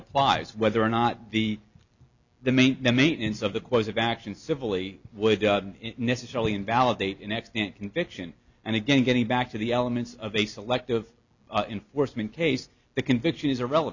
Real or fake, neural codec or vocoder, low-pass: real; none; 7.2 kHz